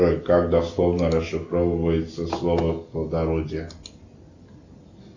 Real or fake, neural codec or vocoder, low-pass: real; none; 7.2 kHz